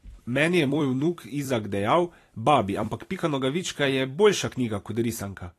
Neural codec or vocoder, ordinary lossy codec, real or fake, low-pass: vocoder, 44.1 kHz, 128 mel bands every 256 samples, BigVGAN v2; AAC, 48 kbps; fake; 14.4 kHz